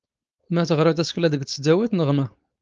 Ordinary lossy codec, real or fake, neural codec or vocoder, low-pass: Opus, 24 kbps; fake; codec, 16 kHz, 4.8 kbps, FACodec; 7.2 kHz